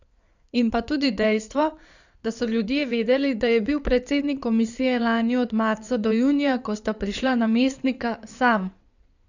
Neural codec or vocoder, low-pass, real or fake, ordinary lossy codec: codec, 16 kHz in and 24 kHz out, 2.2 kbps, FireRedTTS-2 codec; 7.2 kHz; fake; none